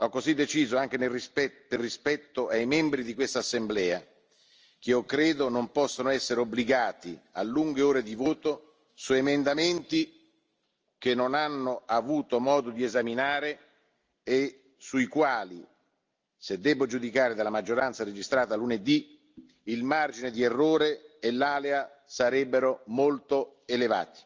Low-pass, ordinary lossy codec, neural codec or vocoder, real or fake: 7.2 kHz; Opus, 24 kbps; none; real